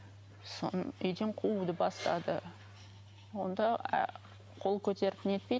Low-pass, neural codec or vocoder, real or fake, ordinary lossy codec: none; none; real; none